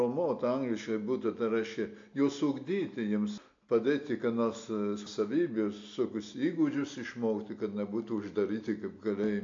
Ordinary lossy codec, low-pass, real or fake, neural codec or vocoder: AAC, 48 kbps; 7.2 kHz; real; none